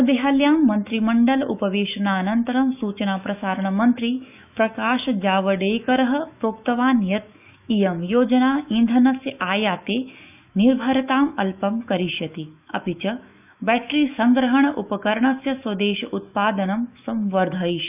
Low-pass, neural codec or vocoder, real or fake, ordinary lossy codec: 3.6 kHz; autoencoder, 48 kHz, 128 numbers a frame, DAC-VAE, trained on Japanese speech; fake; none